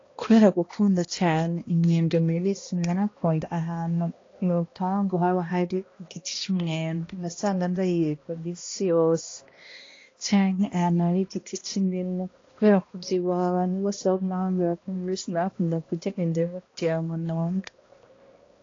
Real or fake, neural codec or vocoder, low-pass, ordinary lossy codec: fake; codec, 16 kHz, 1 kbps, X-Codec, HuBERT features, trained on balanced general audio; 7.2 kHz; AAC, 32 kbps